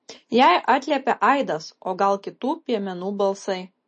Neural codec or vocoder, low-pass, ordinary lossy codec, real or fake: none; 10.8 kHz; MP3, 32 kbps; real